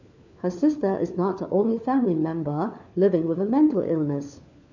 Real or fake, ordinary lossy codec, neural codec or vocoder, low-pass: fake; none; codec, 16 kHz, 4 kbps, FunCodec, trained on LibriTTS, 50 frames a second; 7.2 kHz